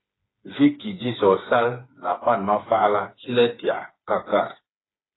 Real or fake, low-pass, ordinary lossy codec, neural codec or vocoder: fake; 7.2 kHz; AAC, 16 kbps; codec, 16 kHz, 4 kbps, FreqCodec, smaller model